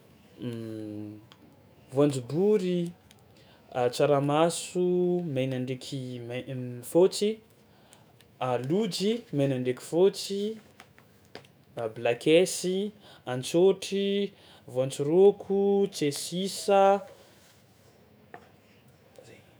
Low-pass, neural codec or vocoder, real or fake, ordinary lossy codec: none; autoencoder, 48 kHz, 128 numbers a frame, DAC-VAE, trained on Japanese speech; fake; none